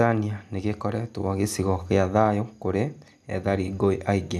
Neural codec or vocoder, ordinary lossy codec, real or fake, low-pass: none; none; real; none